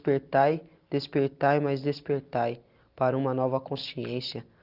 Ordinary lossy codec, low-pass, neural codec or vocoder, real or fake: Opus, 24 kbps; 5.4 kHz; none; real